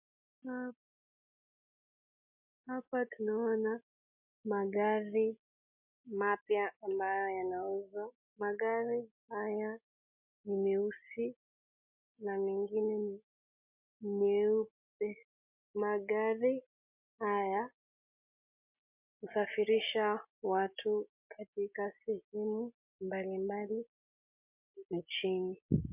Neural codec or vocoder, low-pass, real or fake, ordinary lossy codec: none; 3.6 kHz; real; MP3, 32 kbps